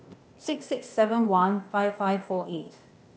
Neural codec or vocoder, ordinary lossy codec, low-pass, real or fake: codec, 16 kHz, 0.8 kbps, ZipCodec; none; none; fake